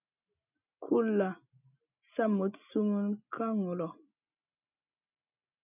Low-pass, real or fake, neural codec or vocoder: 3.6 kHz; real; none